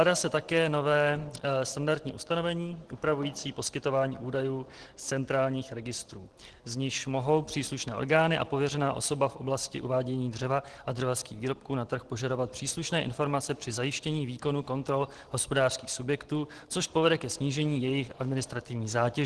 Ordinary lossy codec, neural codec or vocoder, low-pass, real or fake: Opus, 16 kbps; none; 10.8 kHz; real